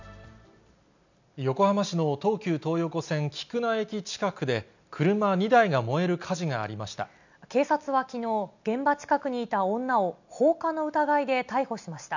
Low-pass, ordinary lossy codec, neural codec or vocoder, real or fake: 7.2 kHz; none; none; real